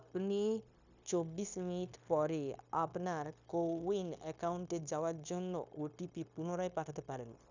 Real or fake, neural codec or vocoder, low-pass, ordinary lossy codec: fake; codec, 16 kHz, 0.9 kbps, LongCat-Audio-Codec; 7.2 kHz; none